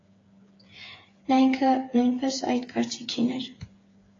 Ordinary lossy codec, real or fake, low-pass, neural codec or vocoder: AAC, 32 kbps; fake; 7.2 kHz; codec, 16 kHz, 8 kbps, FreqCodec, smaller model